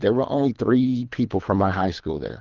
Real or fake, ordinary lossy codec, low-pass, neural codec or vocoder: fake; Opus, 32 kbps; 7.2 kHz; codec, 24 kHz, 3 kbps, HILCodec